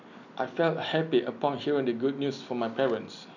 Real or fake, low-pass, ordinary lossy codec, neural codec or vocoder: real; 7.2 kHz; none; none